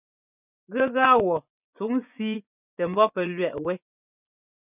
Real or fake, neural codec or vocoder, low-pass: fake; vocoder, 24 kHz, 100 mel bands, Vocos; 3.6 kHz